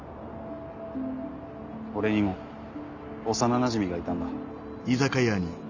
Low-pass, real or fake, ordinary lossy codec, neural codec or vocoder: 7.2 kHz; real; none; none